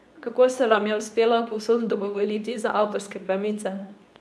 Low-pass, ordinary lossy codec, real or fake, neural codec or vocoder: none; none; fake; codec, 24 kHz, 0.9 kbps, WavTokenizer, medium speech release version 2